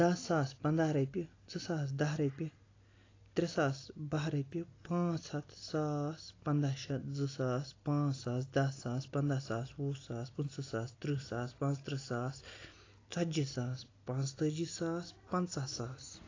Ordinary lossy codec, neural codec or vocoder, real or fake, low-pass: AAC, 32 kbps; none; real; 7.2 kHz